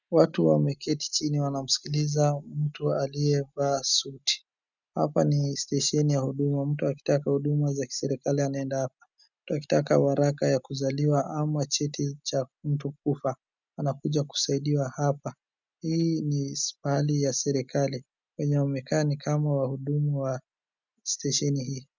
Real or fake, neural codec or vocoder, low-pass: real; none; 7.2 kHz